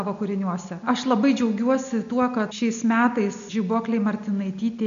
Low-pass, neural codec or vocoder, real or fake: 7.2 kHz; none; real